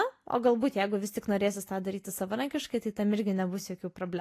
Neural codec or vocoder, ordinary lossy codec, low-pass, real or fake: none; AAC, 48 kbps; 14.4 kHz; real